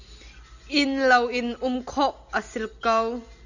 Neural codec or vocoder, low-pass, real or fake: none; 7.2 kHz; real